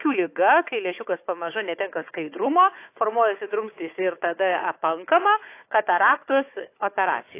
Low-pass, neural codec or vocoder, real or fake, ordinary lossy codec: 3.6 kHz; codec, 16 kHz, 4 kbps, FunCodec, trained on Chinese and English, 50 frames a second; fake; AAC, 24 kbps